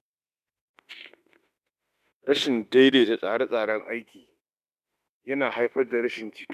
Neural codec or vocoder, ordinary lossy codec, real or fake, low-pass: autoencoder, 48 kHz, 32 numbers a frame, DAC-VAE, trained on Japanese speech; none; fake; 14.4 kHz